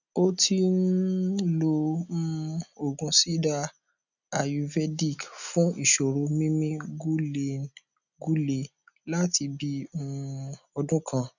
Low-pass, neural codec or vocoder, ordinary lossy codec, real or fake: 7.2 kHz; none; none; real